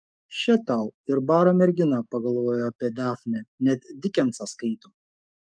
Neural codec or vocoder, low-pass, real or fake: codec, 44.1 kHz, 7.8 kbps, DAC; 9.9 kHz; fake